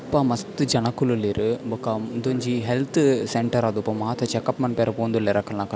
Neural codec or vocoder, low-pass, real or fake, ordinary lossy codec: none; none; real; none